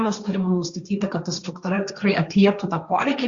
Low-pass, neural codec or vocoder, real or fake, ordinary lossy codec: 7.2 kHz; codec, 16 kHz, 1.1 kbps, Voila-Tokenizer; fake; Opus, 64 kbps